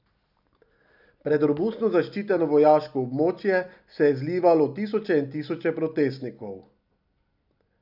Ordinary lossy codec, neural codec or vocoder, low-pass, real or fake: none; none; 5.4 kHz; real